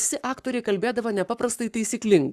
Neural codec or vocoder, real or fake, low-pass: codec, 44.1 kHz, 7.8 kbps, Pupu-Codec; fake; 14.4 kHz